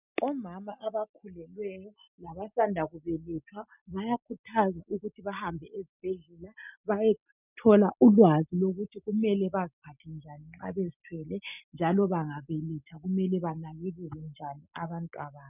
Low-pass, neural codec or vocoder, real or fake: 3.6 kHz; none; real